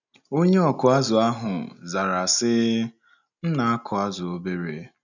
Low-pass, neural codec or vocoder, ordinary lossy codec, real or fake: 7.2 kHz; none; none; real